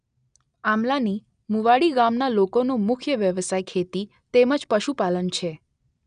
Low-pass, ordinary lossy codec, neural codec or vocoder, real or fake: 9.9 kHz; none; none; real